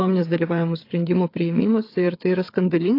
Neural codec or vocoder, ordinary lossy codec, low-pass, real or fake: codec, 16 kHz, 8 kbps, FreqCodec, smaller model; AAC, 32 kbps; 5.4 kHz; fake